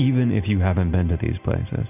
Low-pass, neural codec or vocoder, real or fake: 3.6 kHz; none; real